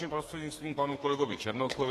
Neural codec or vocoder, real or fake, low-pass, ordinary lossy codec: codec, 32 kHz, 1.9 kbps, SNAC; fake; 14.4 kHz; AAC, 48 kbps